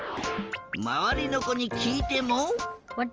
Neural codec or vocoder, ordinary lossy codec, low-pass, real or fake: none; Opus, 24 kbps; 7.2 kHz; real